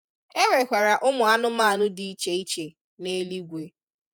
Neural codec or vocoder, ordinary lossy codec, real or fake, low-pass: vocoder, 48 kHz, 128 mel bands, Vocos; none; fake; none